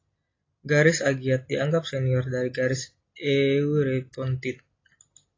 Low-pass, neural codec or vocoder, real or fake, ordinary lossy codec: 7.2 kHz; none; real; AAC, 32 kbps